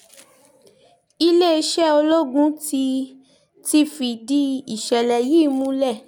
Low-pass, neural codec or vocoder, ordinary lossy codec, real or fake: 19.8 kHz; none; none; real